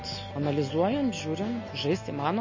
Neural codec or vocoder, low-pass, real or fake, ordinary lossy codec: none; 7.2 kHz; real; MP3, 32 kbps